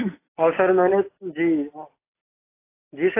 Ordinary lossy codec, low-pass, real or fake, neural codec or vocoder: AAC, 24 kbps; 3.6 kHz; real; none